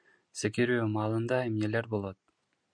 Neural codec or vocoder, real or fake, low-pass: none; real; 9.9 kHz